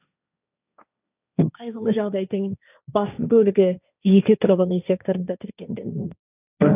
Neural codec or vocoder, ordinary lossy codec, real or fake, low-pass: codec, 16 kHz, 1.1 kbps, Voila-Tokenizer; none; fake; 3.6 kHz